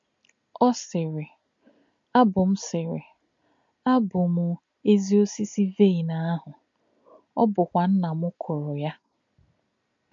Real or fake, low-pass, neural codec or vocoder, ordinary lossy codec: real; 7.2 kHz; none; MP3, 48 kbps